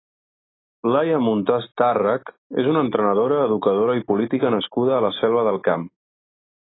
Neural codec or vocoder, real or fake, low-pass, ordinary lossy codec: none; real; 7.2 kHz; AAC, 16 kbps